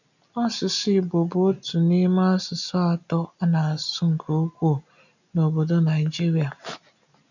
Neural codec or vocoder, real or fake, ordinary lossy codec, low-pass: none; real; none; 7.2 kHz